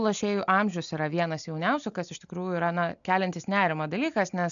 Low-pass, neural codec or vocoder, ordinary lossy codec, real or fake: 7.2 kHz; none; MP3, 96 kbps; real